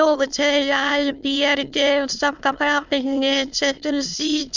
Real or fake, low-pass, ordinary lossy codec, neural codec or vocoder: fake; 7.2 kHz; none; autoencoder, 22.05 kHz, a latent of 192 numbers a frame, VITS, trained on many speakers